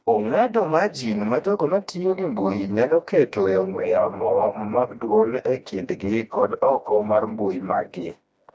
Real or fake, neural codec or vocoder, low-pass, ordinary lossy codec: fake; codec, 16 kHz, 1 kbps, FreqCodec, smaller model; none; none